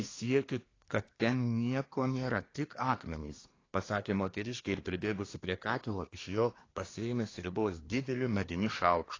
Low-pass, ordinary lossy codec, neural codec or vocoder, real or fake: 7.2 kHz; AAC, 32 kbps; codec, 24 kHz, 1 kbps, SNAC; fake